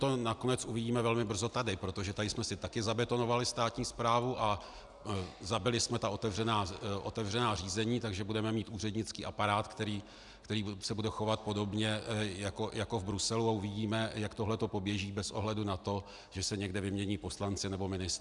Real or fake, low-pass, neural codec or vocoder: real; 10.8 kHz; none